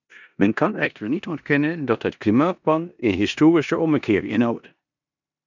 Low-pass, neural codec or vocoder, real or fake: 7.2 kHz; codec, 16 kHz in and 24 kHz out, 0.9 kbps, LongCat-Audio-Codec, four codebook decoder; fake